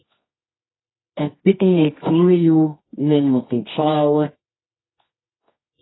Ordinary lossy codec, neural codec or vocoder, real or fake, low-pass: AAC, 16 kbps; codec, 24 kHz, 0.9 kbps, WavTokenizer, medium music audio release; fake; 7.2 kHz